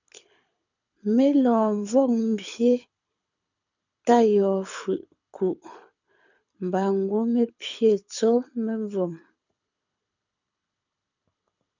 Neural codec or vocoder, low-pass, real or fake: codec, 24 kHz, 6 kbps, HILCodec; 7.2 kHz; fake